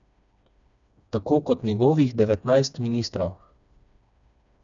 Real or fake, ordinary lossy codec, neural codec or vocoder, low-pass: fake; none; codec, 16 kHz, 2 kbps, FreqCodec, smaller model; 7.2 kHz